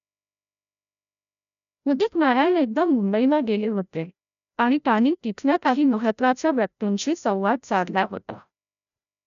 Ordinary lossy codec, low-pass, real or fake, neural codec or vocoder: none; 7.2 kHz; fake; codec, 16 kHz, 0.5 kbps, FreqCodec, larger model